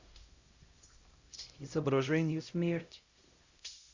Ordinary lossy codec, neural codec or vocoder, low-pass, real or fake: Opus, 64 kbps; codec, 16 kHz, 0.5 kbps, X-Codec, HuBERT features, trained on LibriSpeech; 7.2 kHz; fake